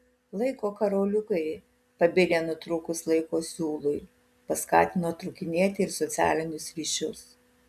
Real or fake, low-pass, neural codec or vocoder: real; 14.4 kHz; none